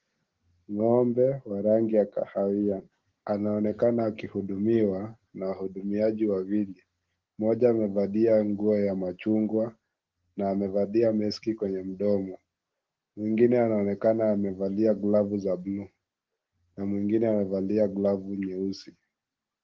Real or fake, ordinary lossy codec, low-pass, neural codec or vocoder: real; Opus, 16 kbps; 7.2 kHz; none